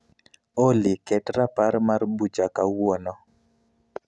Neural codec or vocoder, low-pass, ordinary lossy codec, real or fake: none; none; none; real